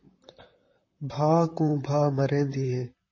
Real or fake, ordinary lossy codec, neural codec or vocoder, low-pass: fake; MP3, 32 kbps; vocoder, 44.1 kHz, 128 mel bands, Pupu-Vocoder; 7.2 kHz